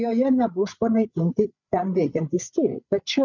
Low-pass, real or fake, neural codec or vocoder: 7.2 kHz; fake; codec, 16 kHz, 8 kbps, FreqCodec, larger model